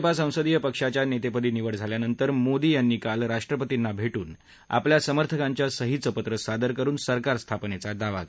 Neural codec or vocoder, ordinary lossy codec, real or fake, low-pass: none; none; real; none